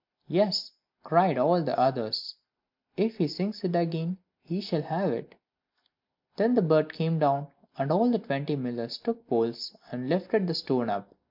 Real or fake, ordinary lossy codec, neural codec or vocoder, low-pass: real; MP3, 48 kbps; none; 5.4 kHz